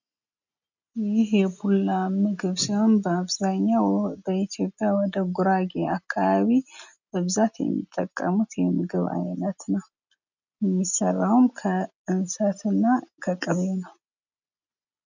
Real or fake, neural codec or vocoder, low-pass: real; none; 7.2 kHz